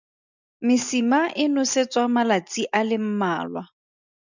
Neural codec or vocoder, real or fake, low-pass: none; real; 7.2 kHz